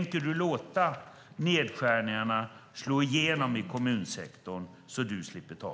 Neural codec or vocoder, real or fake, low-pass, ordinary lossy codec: none; real; none; none